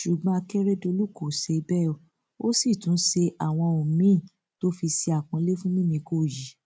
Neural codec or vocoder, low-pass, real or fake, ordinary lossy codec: none; none; real; none